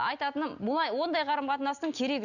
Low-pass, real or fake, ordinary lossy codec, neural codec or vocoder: 7.2 kHz; real; none; none